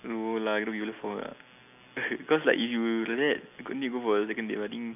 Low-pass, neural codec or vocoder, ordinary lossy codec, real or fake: 3.6 kHz; none; none; real